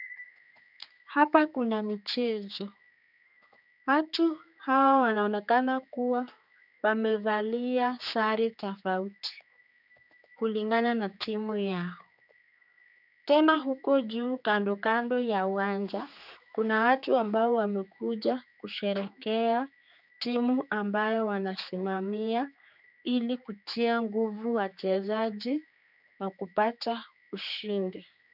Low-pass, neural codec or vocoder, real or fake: 5.4 kHz; codec, 16 kHz, 4 kbps, X-Codec, HuBERT features, trained on general audio; fake